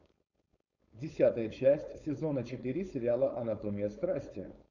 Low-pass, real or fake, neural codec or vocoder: 7.2 kHz; fake; codec, 16 kHz, 4.8 kbps, FACodec